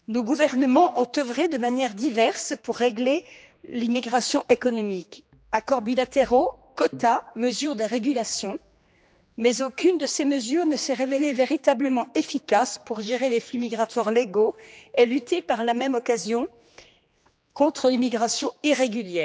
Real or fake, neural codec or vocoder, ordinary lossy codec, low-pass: fake; codec, 16 kHz, 2 kbps, X-Codec, HuBERT features, trained on general audio; none; none